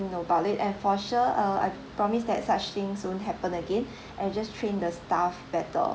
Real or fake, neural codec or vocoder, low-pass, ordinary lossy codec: real; none; none; none